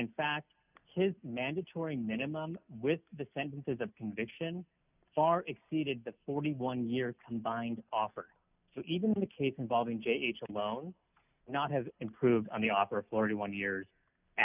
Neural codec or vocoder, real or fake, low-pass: none; real; 3.6 kHz